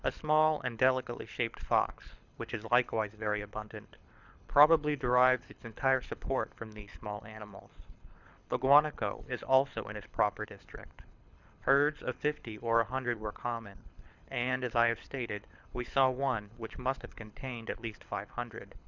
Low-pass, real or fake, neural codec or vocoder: 7.2 kHz; fake; codec, 24 kHz, 6 kbps, HILCodec